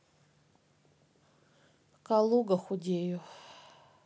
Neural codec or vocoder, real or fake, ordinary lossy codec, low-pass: none; real; none; none